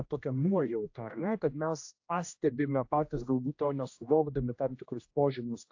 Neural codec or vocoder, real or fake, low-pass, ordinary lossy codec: codec, 16 kHz, 1 kbps, X-Codec, HuBERT features, trained on general audio; fake; 7.2 kHz; AAC, 48 kbps